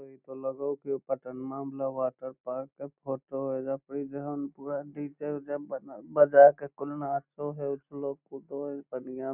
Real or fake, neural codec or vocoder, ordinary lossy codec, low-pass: real; none; none; 3.6 kHz